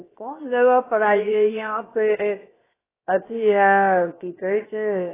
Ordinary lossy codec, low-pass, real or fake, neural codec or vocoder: AAC, 16 kbps; 3.6 kHz; fake; codec, 16 kHz, 0.7 kbps, FocalCodec